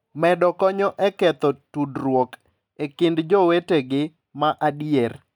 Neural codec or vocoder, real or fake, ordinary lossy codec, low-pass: none; real; none; 19.8 kHz